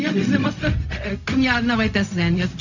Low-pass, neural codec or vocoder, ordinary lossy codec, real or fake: 7.2 kHz; codec, 16 kHz, 0.4 kbps, LongCat-Audio-Codec; none; fake